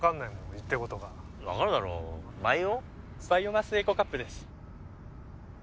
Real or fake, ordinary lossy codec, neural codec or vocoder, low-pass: real; none; none; none